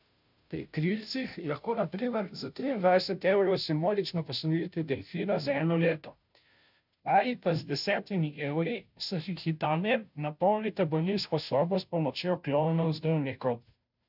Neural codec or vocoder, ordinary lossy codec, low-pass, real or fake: codec, 16 kHz, 0.5 kbps, FunCodec, trained on Chinese and English, 25 frames a second; none; 5.4 kHz; fake